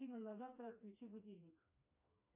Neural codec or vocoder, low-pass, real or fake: codec, 16 kHz, 2 kbps, FreqCodec, smaller model; 3.6 kHz; fake